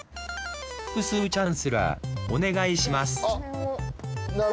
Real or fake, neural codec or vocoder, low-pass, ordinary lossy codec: real; none; none; none